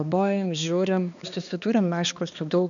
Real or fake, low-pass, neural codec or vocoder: fake; 7.2 kHz; codec, 16 kHz, 2 kbps, X-Codec, HuBERT features, trained on balanced general audio